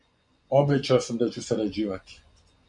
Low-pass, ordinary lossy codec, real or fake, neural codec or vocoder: 10.8 kHz; MP3, 64 kbps; fake; vocoder, 24 kHz, 100 mel bands, Vocos